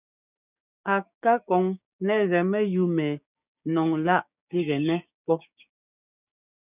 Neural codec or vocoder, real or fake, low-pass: codec, 16 kHz, 6 kbps, DAC; fake; 3.6 kHz